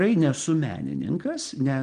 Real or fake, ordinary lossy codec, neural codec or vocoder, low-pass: real; Opus, 24 kbps; none; 9.9 kHz